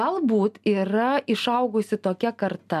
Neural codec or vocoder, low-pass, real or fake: none; 14.4 kHz; real